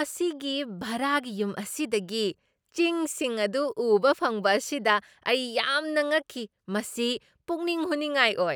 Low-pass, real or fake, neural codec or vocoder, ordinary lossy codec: none; real; none; none